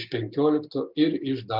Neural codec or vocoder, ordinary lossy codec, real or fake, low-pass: none; Opus, 64 kbps; real; 5.4 kHz